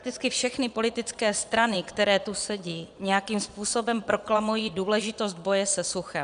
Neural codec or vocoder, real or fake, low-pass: vocoder, 22.05 kHz, 80 mel bands, Vocos; fake; 9.9 kHz